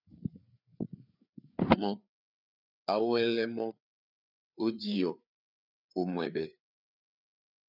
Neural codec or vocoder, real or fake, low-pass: codec, 16 kHz, 4 kbps, FreqCodec, larger model; fake; 5.4 kHz